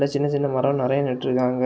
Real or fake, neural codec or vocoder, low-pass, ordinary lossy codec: real; none; none; none